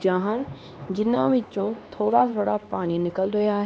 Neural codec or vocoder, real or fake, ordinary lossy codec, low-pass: codec, 16 kHz, 2 kbps, X-Codec, HuBERT features, trained on LibriSpeech; fake; none; none